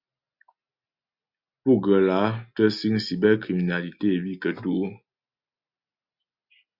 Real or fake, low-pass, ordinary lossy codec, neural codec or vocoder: real; 5.4 kHz; Opus, 64 kbps; none